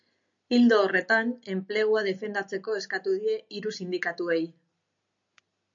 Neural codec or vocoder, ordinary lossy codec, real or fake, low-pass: none; MP3, 64 kbps; real; 7.2 kHz